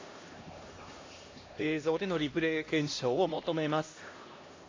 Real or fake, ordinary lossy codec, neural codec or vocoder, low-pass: fake; AAC, 32 kbps; codec, 16 kHz, 1 kbps, X-Codec, HuBERT features, trained on LibriSpeech; 7.2 kHz